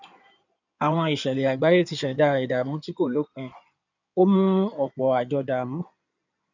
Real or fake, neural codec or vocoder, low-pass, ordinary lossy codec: fake; codec, 16 kHz in and 24 kHz out, 2.2 kbps, FireRedTTS-2 codec; 7.2 kHz; none